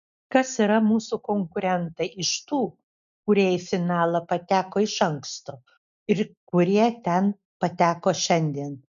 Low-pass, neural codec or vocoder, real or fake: 7.2 kHz; none; real